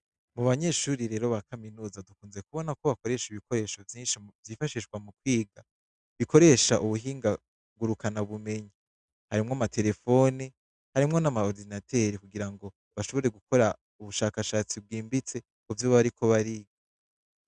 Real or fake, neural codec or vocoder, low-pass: real; none; 9.9 kHz